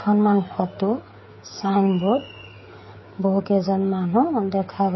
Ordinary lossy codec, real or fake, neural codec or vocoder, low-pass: MP3, 24 kbps; fake; codec, 16 kHz, 16 kbps, FreqCodec, smaller model; 7.2 kHz